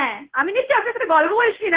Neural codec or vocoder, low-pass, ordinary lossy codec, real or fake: codec, 16 kHz in and 24 kHz out, 1 kbps, XY-Tokenizer; 3.6 kHz; Opus, 16 kbps; fake